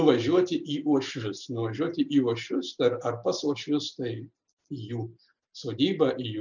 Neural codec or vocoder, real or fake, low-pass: none; real; 7.2 kHz